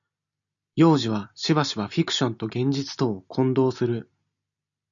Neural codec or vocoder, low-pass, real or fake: none; 7.2 kHz; real